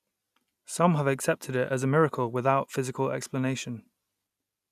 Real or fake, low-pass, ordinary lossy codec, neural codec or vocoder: real; 14.4 kHz; none; none